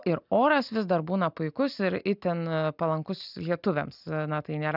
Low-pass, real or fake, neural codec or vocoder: 5.4 kHz; real; none